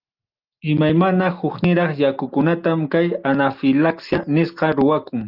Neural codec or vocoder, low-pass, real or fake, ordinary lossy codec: none; 5.4 kHz; real; Opus, 24 kbps